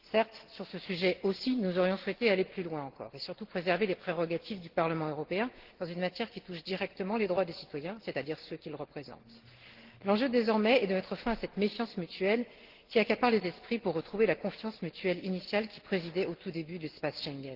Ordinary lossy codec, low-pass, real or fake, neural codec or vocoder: Opus, 16 kbps; 5.4 kHz; real; none